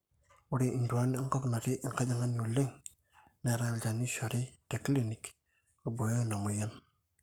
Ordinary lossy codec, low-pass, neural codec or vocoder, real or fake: none; none; codec, 44.1 kHz, 7.8 kbps, Pupu-Codec; fake